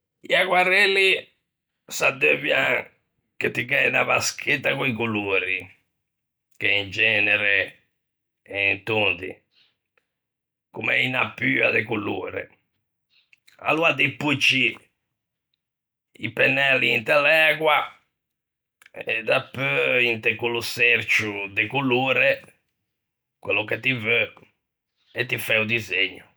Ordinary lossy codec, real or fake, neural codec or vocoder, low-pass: none; real; none; none